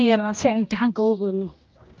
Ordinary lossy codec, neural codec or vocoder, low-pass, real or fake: Opus, 24 kbps; codec, 16 kHz, 1 kbps, X-Codec, HuBERT features, trained on general audio; 7.2 kHz; fake